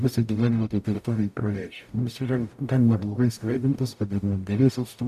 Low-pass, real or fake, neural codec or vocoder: 14.4 kHz; fake; codec, 44.1 kHz, 0.9 kbps, DAC